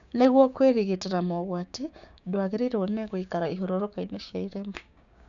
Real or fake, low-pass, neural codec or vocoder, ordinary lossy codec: fake; 7.2 kHz; codec, 16 kHz, 6 kbps, DAC; none